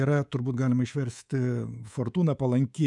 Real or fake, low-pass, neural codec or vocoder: fake; 10.8 kHz; codec, 24 kHz, 3.1 kbps, DualCodec